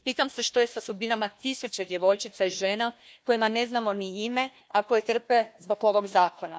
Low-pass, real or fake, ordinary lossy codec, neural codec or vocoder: none; fake; none; codec, 16 kHz, 1 kbps, FunCodec, trained on Chinese and English, 50 frames a second